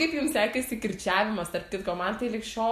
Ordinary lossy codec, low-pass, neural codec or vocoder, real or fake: MP3, 64 kbps; 14.4 kHz; none; real